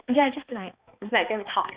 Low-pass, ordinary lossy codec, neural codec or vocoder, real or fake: 3.6 kHz; Opus, 64 kbps; codec, 16 kHz, 2 kbps, X-Codec, HuBERT features, trained on balanced general audio; fake